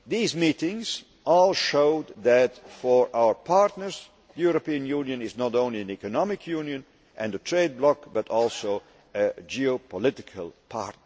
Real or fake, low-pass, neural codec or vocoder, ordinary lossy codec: real; none; none; none